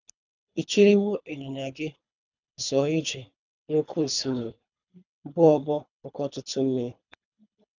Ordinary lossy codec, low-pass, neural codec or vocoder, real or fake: none; 7.2 kHz; codec, 24 kHz, 3 kbps, HILCodec; fake